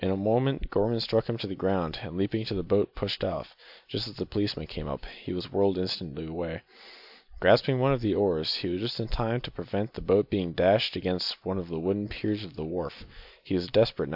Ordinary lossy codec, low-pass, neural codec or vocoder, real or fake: AAC, 48 kbps; 5.4 kHz; none; real